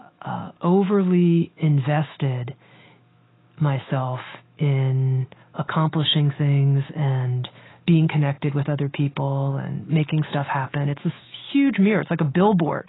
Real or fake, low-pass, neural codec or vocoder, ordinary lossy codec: fake; 7.2 kHz; autoencoder, 48 kHz, 128 numbers a frame, DAC-VAE, trained on Japanese speech; AAC, 16 kbps